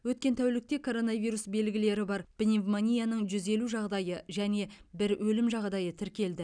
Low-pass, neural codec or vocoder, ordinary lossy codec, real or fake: 9.9 kHz; none; none; real